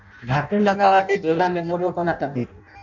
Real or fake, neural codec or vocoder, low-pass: fake; codec, 16 kHz in and 24 kHz out, 0.6 kbps, FireRedTTS-2 codec; 7.2 kHz